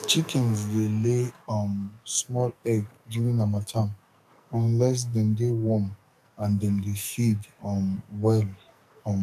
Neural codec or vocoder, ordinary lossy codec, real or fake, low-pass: codec, 44.1 kHz, 2.6 kbps, SNAC; none; fake; 14.4 kHz